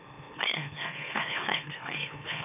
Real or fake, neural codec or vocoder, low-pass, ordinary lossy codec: fake; autoencoder, 44.1 kHz, a latent of 192 numbers a frame, MeloTTS; 3.6 kHz; none